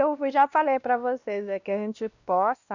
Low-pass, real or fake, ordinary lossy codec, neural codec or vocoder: 7.2 kHz; fake; none; codec, 16 kHz, 1 kbps, X-Codec, WavLM features, trained on Multilingual LibriSpeech